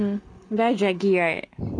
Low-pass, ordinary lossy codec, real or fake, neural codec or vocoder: 9.9 kHz; none; real; none